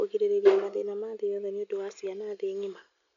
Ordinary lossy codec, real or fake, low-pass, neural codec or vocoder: none; real; 7.2 kHz; none